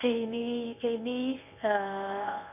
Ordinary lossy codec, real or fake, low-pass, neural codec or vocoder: none; fake; 3.6 kHz; codec, 24 kHz, 0.9 kbps, WavTokenizer, medium speech release version 1